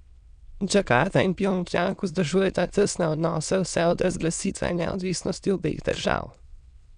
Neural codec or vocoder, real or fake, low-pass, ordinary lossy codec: autoencoder, 22.05 kHz, a latent of 192 numbers a frame, VITS, trained on many speakers; fake; 9.9 kHz; none